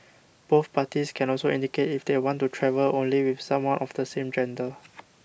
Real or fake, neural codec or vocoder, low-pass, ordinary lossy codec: real; none; none; none